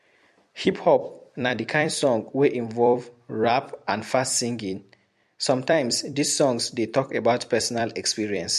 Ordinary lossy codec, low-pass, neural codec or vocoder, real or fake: MP3, 64 kbps; 14.4 kHz; vocoder, 44.1 kHz, 128 mel bands every 256 samples, BigVGAN v2; fake